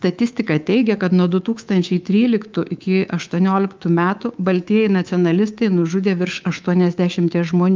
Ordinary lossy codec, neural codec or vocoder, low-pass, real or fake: Opus, 24 kbps; codec, 24 kHz, 3.1 kbps, DualCodec; 7.2 kHz; fake